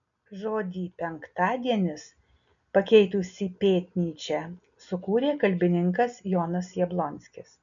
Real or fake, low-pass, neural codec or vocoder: real; 7.2 kHz; none